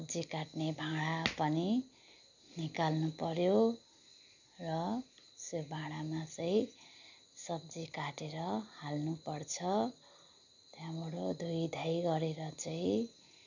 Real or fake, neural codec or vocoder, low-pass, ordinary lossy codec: real; none; 7.2 kHz; none